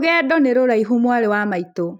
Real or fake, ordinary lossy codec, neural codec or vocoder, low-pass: real; none; none; 19.8 kHz